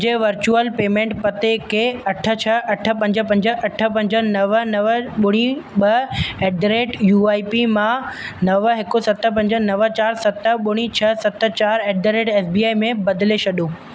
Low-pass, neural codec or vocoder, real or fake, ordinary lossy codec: none; none; real; none